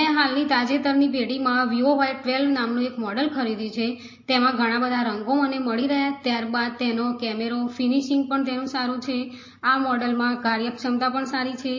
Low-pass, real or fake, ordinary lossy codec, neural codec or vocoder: 7.2 kHz; real; MP3, 32 kbps; none